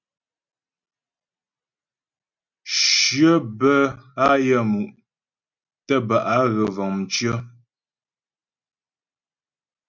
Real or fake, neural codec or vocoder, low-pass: real; none; 7.2 kHz